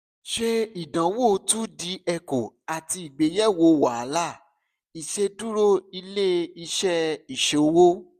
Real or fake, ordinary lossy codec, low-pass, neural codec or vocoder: real; none; 14.4 kHz; none